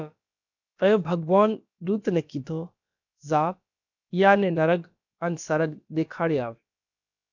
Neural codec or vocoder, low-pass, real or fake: codec, 16 kHz, about 1 kbps, DyCAST, with the encoder's durations; 7.2 kHz; fake